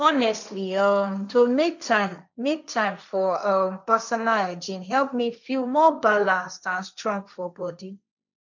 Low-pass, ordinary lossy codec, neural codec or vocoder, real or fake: 7.2 kHz; none; codec, 16 kHz, 1.1 kbps, Voila-Tokenizer; fake